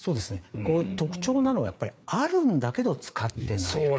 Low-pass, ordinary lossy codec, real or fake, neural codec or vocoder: none; none; fake; codec, 16 kHz, 4 kbps, FreqCodec, larger model